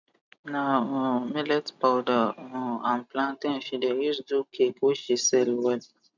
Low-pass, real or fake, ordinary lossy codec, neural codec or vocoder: 7.2 kHz; real; none; none